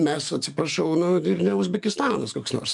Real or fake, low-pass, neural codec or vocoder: fake; 10.8 kHz; codec, 44.1 kHz, 7.8 kbps, DAC